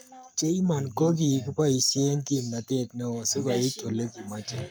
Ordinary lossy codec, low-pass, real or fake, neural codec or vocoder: none; none; fake; codec, 44.1 kHz, 7.8 kbps, Pupu-Codec